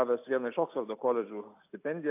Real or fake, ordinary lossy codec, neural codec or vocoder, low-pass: real; AAC, 24 kbps; none; 3.6 kHz